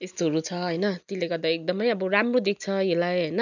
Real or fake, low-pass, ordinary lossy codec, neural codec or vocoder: real; 7.2 kHz; none; none